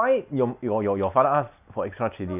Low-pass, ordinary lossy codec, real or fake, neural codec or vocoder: 3.6 kHz; none; real; none